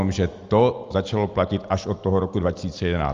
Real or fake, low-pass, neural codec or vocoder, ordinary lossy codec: real; 7.2 kHz; none; Opus, 32 kbps